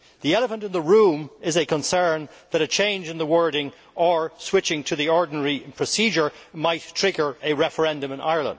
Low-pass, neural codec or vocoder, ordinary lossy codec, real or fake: none; none; none; real